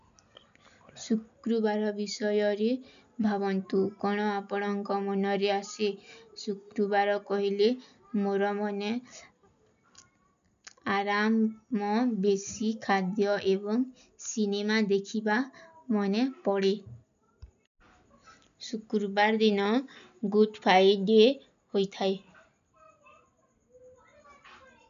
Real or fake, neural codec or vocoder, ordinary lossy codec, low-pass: real; none; none; 7.2 kHz